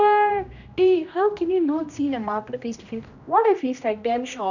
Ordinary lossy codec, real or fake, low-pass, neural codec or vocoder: none; fake; 7.2 kHz; codec, 16 kHz, 1 kbps, X-Codec, HuBERT features, trained on general audio